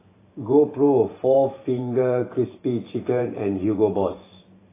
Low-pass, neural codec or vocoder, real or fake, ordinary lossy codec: 3.6 kHz; vocoder, 44.1 kHz, 128 mel bands every 512 samples, BigVGAN v2; fake; AAC, 16 kbps